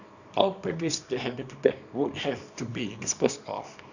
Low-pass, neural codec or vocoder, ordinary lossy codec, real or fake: 7.2 kHz; codec, 24 kHz, 0.9 kbps, WavTokenizer, small release; none; fake